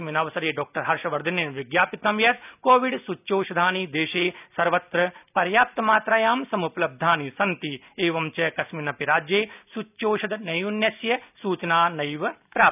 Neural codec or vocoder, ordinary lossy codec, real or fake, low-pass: none; none; real; 3.6 kHz